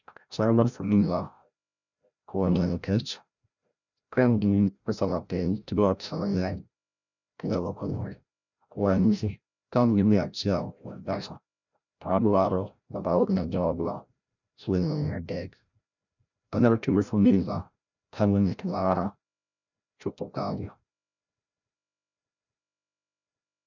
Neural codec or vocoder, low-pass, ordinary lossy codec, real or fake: codec, 16 kHz, 0.5 kbps, FreqCodec, larger model; 7.2 kHz; none; fake